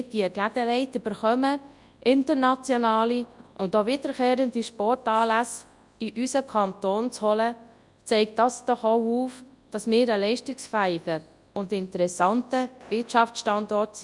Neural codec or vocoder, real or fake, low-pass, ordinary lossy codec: codec, 24 kHz, 0.9 kbps, WavTokenizer, large speech release; fake; 10.8 kHz; Opus, 64 kbps